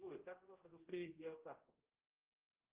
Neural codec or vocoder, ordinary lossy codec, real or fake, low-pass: codec, 16 kHz, 0.5 kbps, X-Codec, HuBERT features, trained on general audio; Opus, 32 kbps; fake; 3.6 kHz